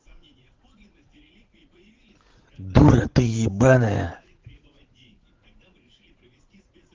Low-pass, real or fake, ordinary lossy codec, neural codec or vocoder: 7.2 kHz; fake; Opus, 16 kbps; vocoder, 44.1 kHz, 80 mel bands, Vocos